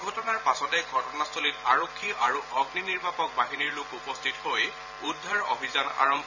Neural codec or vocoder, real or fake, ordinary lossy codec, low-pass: vocoder, 44.1 kHz, 128 mel bands every 512 samples, BigVGAN v2; fake; none; 7.2 kHz